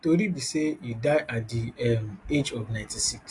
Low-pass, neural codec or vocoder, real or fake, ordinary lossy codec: 10.8 kHz; none; real; AAC, 48 kbps